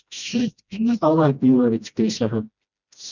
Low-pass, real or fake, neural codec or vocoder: 7.2 kHz; fake; codec, 16 kHz, 1 kbps, FreqCodec, smaller model